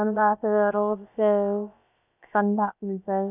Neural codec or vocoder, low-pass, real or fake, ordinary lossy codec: codec, 16 kHz, about 1 kbps, DyCAST, with the encoder's durations; 3.6 kHz; fake; none